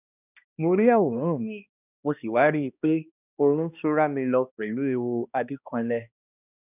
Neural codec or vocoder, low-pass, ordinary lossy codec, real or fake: codec, 16 kHz, 1 kbps, X-Codec, HuBERT features, trained on balanced general audio; 3.6 kHz; none; fake